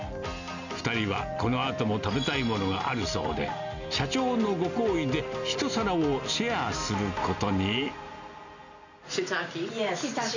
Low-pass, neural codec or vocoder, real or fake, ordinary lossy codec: 7.2 kHz; none; real; none